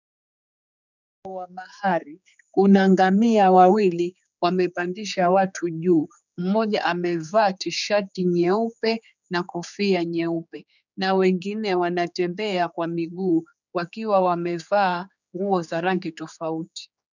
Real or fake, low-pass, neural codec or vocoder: fake; 7.2 kHz; codec, 16 kHz, 4 kbps, X-Codec, HuBERT features, trained on general audio